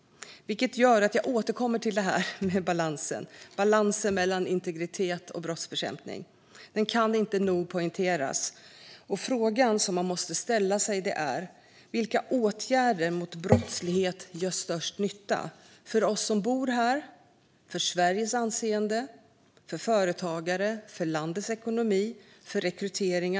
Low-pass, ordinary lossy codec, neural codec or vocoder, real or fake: none; none; none; real